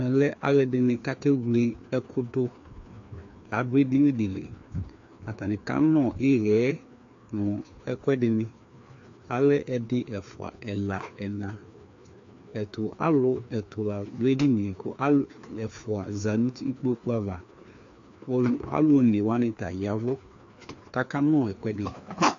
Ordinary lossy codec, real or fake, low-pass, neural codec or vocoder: AAC, 48 kbps; fake; 7.2 kHz; codec, 16 kHz, 2 kbps, FreqCodec, larger model